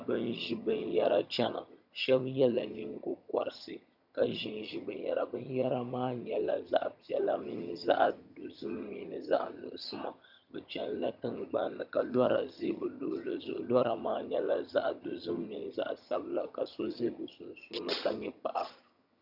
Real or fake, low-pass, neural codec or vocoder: fake; 5.4 kHz; vocoder, 22.05 kHz, 80 mel bands, HiFi-GAN